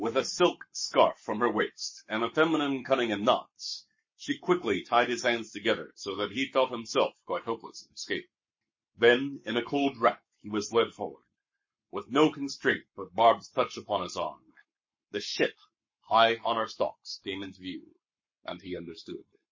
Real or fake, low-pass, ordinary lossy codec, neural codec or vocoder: fake; 7.2 kHz; MP3, 32 kbps; codec, 44.1 kHz, 7.8 kbps, Pupu-Codec